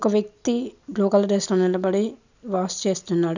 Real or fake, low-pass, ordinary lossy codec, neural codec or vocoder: real; 7.2 kHz; none; none